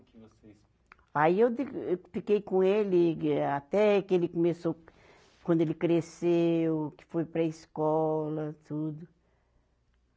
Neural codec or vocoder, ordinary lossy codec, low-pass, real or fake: none; none; none; real